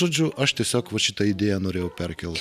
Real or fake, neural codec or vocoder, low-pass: real; none; 14.4 kHz